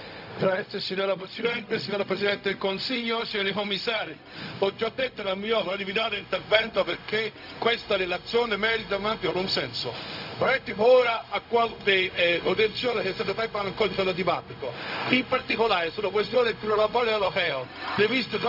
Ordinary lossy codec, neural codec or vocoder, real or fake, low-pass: none; codec, 16 kHz, 0.4 kbps, LongCat-Audio-Codec; fake; 5.4 kHz